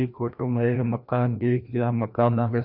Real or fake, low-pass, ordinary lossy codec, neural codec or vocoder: fake; 5.4 kHz; none; codec, 16 kHz, 1 kbps, FreqCodec, larger model